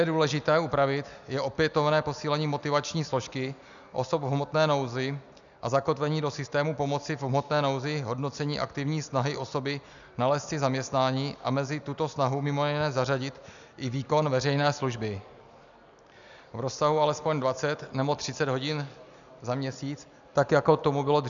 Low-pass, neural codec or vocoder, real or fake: 7.2 kHz; none; real